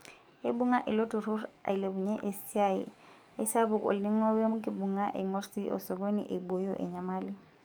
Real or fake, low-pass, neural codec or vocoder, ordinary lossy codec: fake; none; codec, 44.1 kHz, 7.8 kbps, DAC; none